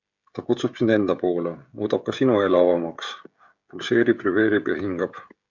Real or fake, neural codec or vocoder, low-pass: fake; codec, 16 kHz, 16 kbps, FreqCodec, smaller model; 7.2 kHz